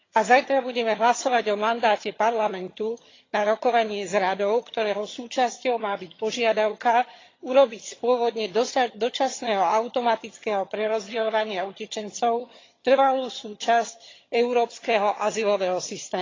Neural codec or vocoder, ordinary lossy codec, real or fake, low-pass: vocoder, 22.05 kHz, 80 mel bands, HiFi-GAN; AAC, 32 kbps; fake; 7.2 kHz